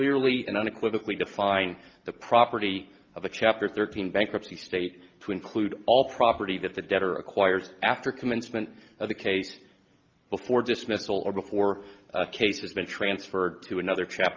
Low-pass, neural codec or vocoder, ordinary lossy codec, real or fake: 7.2 kHz; none; Opus, 24 kbps; real